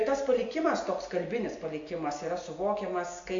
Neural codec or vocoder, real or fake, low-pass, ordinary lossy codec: none; real; 7.2 kHz; AAC, 48 kbps